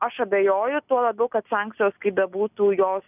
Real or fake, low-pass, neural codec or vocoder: real; 3.6 kHz; none